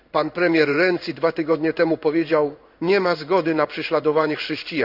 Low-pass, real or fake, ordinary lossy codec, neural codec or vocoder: 5.4 kHz; fake; none; codec, 16 kHz in and 24 kHz out, 1 kbps, XY-Tokenizer